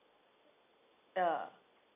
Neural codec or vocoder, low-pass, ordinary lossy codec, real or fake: none; 3.6 kHz; none; real